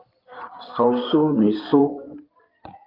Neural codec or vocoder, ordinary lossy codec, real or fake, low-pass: codec, 16 kHz in and 24 kHz out, 2.2 kbps, FireRedTTS-2 codec; Opus, 24 kbps; fake; 5.4 kHz